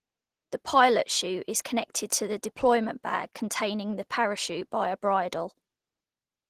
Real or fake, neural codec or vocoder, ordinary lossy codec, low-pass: real; none; Opus, 16 kbps; 14.4 kHz